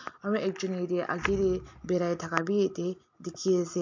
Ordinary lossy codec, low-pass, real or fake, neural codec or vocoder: MP3, 48 kbps; 7.2 kHz; real; none